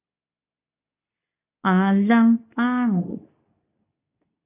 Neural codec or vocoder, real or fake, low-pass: codec, 24 kHz, 0.9 kbps, WavTokenizer, medium speech release version 2; fake; 3.6 kHz